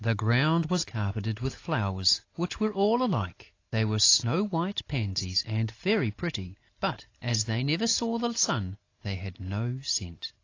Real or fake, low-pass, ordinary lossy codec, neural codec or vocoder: real; 7.2 kHz; AAC, 32 kbps; none